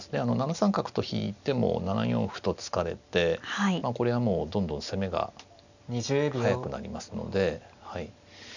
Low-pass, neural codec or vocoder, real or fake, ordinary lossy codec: 7.2 kHz; none; real; none